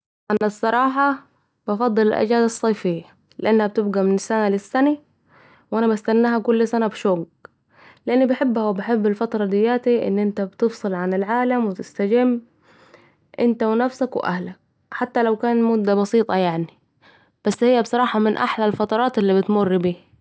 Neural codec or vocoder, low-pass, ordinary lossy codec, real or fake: none; none; none; real